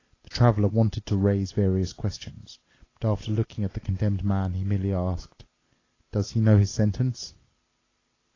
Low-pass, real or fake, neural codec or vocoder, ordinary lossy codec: 7.2 kHz; real; none; AAC, 32 kbps